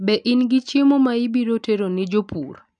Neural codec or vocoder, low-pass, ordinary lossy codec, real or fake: none; 9.9 kHz; none; real